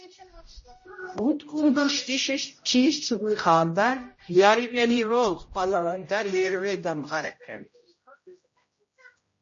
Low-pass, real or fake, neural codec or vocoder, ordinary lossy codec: 7.2 kHz; fake; codec, 16 kHz, 0.5 kbps, X-Codec, HuBERT features, trained on general audio; MP3, 32 kbps